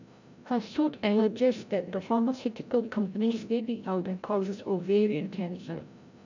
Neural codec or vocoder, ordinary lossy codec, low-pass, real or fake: codec, 16 kHz, 0.5 kbps, FreqCodec, larger model; none; 7.2 kHz; fake